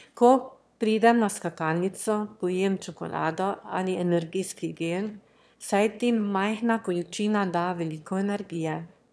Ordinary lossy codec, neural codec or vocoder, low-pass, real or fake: none; autoencoder, 22.05 kHz, a latent of 192 numbers a frame, VITS, trained on one speaker; none; fake